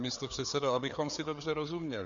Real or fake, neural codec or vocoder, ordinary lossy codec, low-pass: fake; codec, 16 kHz, 4 kbps, FreqCodec, larger model; Opus, 64 kbps; 7.2 kHz